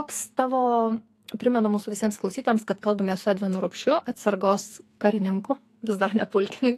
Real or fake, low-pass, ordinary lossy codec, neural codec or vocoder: fake; 14.4 kHz; AAC, 64 kbps; codec, 44.1 kHz, 2.6 kbps, SNAC